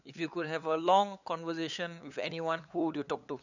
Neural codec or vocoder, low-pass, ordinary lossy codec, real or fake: codec, 16 kHz, 8 kbps, FunCodec, trained on LibriTTS, 25 frames a second; 7.2 kHz; none; fake